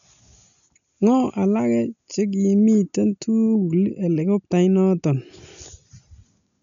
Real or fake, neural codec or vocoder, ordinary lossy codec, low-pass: real; none; none; 7.2 kHz